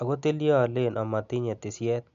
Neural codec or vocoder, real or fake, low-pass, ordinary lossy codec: none; real; 7.2 kHz; MP3, 64 kbps